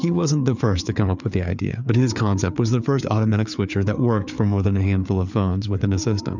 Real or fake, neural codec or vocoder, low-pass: fake; codec, 16 kHz, 4 kbps, FreqCodec, larger model; 7.2 kHz